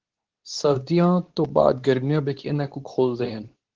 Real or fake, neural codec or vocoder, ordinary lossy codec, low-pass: fake; codec, 24 kHz, 0.9 kbps, WavTokenizer, medium speech release version 2; Opus, 16 kbps; 7.2 kHz